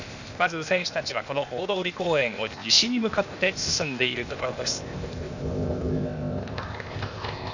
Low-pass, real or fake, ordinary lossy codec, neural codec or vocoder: 7.2 kHz; fake; none; codec, 16 kHz, 0.8 kbps, ZipCodec